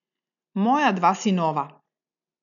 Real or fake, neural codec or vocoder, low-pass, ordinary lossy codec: real; none; 7.2 kHz; none